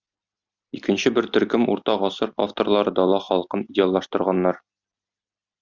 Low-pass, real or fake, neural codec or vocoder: 7.2 kHz; real; none